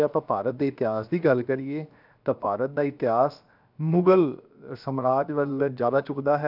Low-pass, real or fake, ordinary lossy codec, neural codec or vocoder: 5.4 kHz; fake; none; codec, 16 kHz, 0.7 kbps, FocalCodec